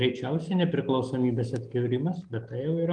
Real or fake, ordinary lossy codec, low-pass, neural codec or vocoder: real; Opus, 32 kbps; 9.9 kHz; none